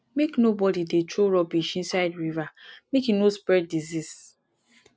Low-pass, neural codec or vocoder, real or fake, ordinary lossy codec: none; none; real; none